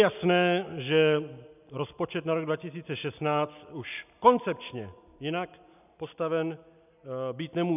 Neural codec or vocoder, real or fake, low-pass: none; real; 3.6 kHz